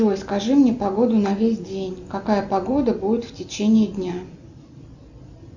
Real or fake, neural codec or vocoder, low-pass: real; none; 7.2 kHz